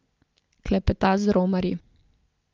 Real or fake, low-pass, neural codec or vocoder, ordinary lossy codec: real; 7.2 kHz; none; Opus, 32 kbps